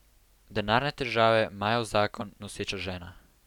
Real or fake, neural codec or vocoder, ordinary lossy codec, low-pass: real; none; none; 19.8 kHz